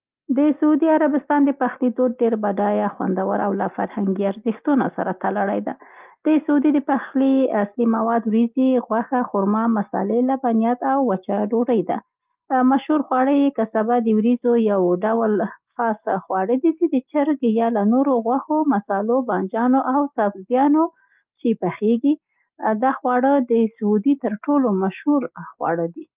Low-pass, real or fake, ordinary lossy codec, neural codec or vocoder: 3.6 kHz; real; Opus, 32 kbps; none